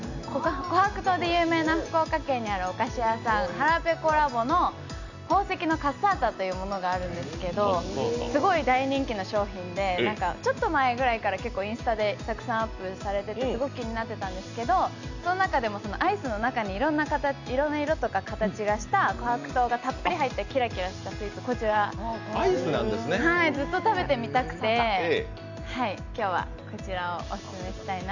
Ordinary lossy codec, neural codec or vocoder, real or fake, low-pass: none; none; real; 7.2 kHz